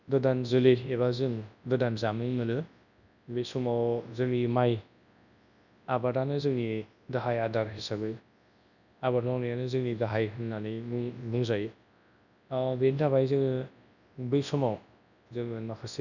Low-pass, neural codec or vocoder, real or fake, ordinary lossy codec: 7.2 kHz; codec, 24 kHz, 0.9 kbps, WavTokenizer, large speech release; fake; none